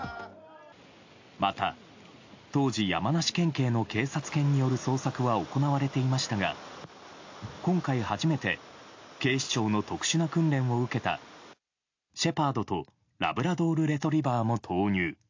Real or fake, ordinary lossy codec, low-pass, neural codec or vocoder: real; AAC, 48 kbps; 7.2 kHz; none